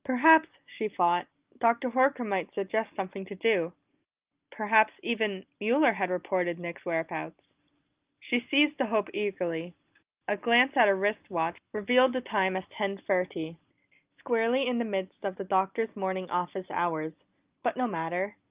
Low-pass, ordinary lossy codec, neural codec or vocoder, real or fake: 3.6 kHz; Opus, 24 kbps; none; real